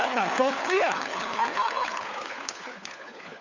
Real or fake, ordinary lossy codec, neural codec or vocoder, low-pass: fake; Opus, 64 kbps; codec, 16 kHz, 4 kbps, FunCodec, trained on LibriTTS, 50 frames a second; 7.2 kHz